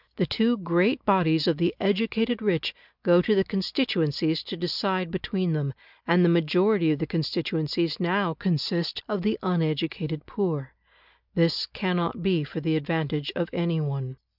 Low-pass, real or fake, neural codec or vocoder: 5.4 kHz; real; none